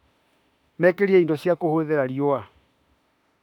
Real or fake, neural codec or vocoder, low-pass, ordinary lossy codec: fake; autoencoder, 48 kHz, 32 numbers a frame, DAC-VAE, trained on Japanese speech; 19.8 kHz; none